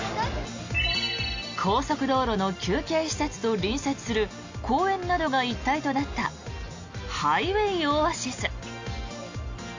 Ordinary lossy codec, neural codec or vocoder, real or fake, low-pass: AAC, 48 kbps; none; real; 7.2 kHz